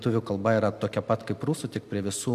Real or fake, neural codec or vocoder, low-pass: real; none; 14.4 kHz